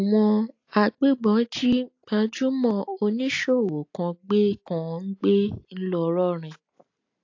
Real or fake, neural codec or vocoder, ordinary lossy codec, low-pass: real; none; AAC, 48 kbps; 7.2 kHz